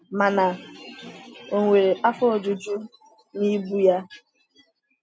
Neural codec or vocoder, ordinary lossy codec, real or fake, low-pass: none; none; real; none